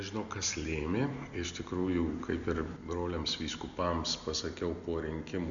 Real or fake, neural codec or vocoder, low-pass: real; none; 7.2 kHz